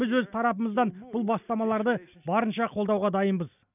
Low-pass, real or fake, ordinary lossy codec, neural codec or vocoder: 3.6 kHz; real; none; none